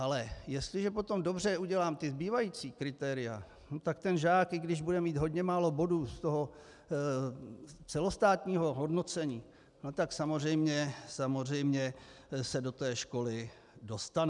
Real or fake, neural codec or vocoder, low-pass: real; none; 10.8 kHz